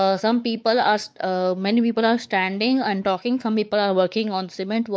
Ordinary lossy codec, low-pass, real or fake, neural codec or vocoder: none; none; fake; codec, 16 kHz, 4 kbps, X-Codec, WavLM features, trained on Multilingual LibriSpeech